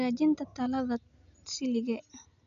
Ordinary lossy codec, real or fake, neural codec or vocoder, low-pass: none; real; none; 7.2 kHz